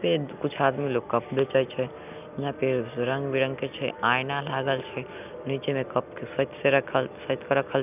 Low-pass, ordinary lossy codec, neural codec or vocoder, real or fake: 3.6 kHz; none; none; real